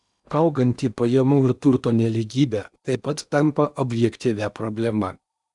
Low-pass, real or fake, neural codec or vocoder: 10.8 kHz; fake; codec, 16 kHz in and 24 kHz out, 0.8 kbps, FocalCodec, streaming, 65536 codes